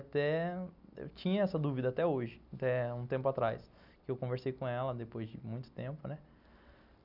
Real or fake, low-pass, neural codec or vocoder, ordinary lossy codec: real; 5.4 kHz; none; none